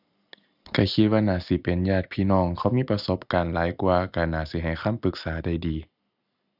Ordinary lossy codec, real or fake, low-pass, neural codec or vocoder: none; real; 5.4 kHz; none